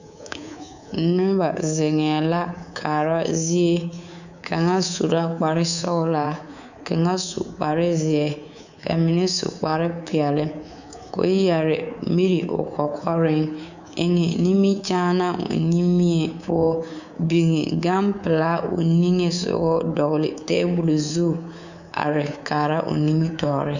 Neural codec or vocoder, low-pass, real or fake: codec, 24 kHz, 3.1 kbps, DualCodec; 7.2 kHz; fake